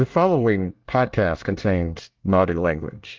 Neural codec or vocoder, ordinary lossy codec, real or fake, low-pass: codec, 24 kHz, 1 kbps, SNAC; Opus, 32 kbps; fake; 7.2 kHz